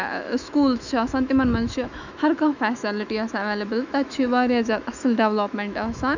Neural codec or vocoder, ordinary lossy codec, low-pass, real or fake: autoencoder, 48 kHz, 128 numbers a frame, DAC-VAE, trained on Japanese speech; none; 7.2 kHz; fake